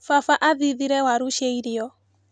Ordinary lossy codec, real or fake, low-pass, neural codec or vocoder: none; real; none; none